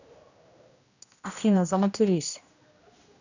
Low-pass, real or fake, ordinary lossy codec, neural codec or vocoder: 7.2 kHz; fake; none; codec, 16 kHz, 1 kbps, X-Codec, HuBERT features, trained on general audio